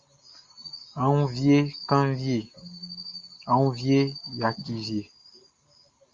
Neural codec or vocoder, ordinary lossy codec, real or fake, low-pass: none; Opus, 32 kbps; real; 7.2 kHz